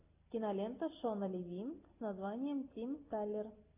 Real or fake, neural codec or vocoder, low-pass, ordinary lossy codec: real; none; 3.6 kHz; AAC, 32 kbps